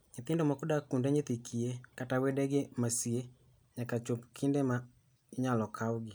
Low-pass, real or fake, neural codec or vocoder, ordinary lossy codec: none; real; none; none